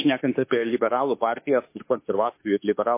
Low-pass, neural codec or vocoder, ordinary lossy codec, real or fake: 3.6 kHz; autoencoder, 48 kHz, 32 numbers a frame, DAC-VAE, trained on Japanese speech; MP3, 24 kbps; fake